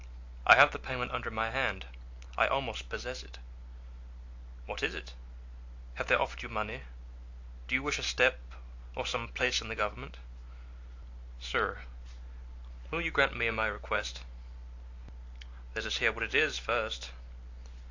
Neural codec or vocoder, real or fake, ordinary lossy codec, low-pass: none; real; AAC, 48 kbps; 7.2 kHz